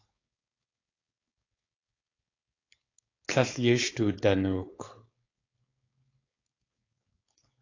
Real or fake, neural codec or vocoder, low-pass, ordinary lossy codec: fake; codec, 16 kHz, 4.8 kbps, FACodec; 7.2 kHz; AAC, 32 kbps